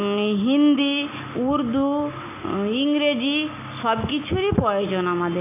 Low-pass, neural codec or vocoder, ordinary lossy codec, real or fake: 3.6 kHz; none; AAC, 24 kbps; real